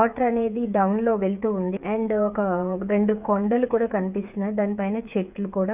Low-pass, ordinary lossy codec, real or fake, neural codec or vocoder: 3.6 kHz; none; fake; codec, 16 kHz, 8 kbps, FreqCodec, smaller model